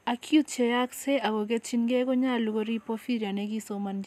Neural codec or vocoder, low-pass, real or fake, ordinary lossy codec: none; 14.4 kHz; real; none